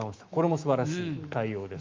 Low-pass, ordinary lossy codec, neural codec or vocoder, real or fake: 7.2 kHz; Opus, 24 kbps; autoencoder, 48 kHz, 128 numbers a frame, DAC-VAE, trained on Japanese speech; fake